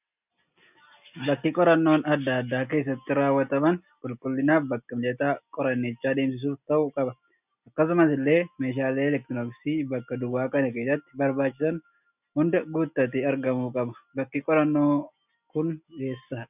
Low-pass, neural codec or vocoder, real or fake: 3.6 kHz; none; real